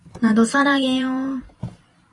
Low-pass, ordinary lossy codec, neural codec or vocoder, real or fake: 10.8 kHz; AAC, 32 kbps; vocoder, 44.1 kHz, 128 mel bands every 512 samples, BigVGAN v2; fake